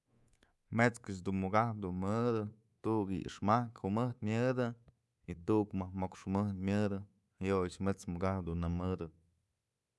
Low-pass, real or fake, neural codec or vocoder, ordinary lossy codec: none; fake; codec, 24 kHz, 3.1 kbps, DualCodec; none